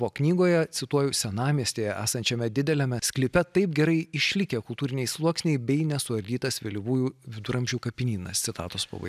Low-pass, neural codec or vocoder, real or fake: 14.4 kHz; none; real